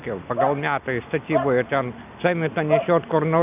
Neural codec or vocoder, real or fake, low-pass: none; real; 3.6 kHz